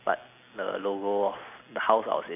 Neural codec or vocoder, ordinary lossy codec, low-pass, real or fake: none; AAC, 24 kbps; 3.6 kHz; real